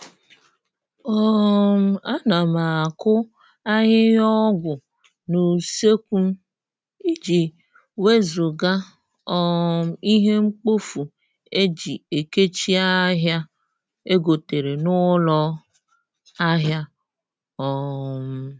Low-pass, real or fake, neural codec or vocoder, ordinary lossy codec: none; real; none; none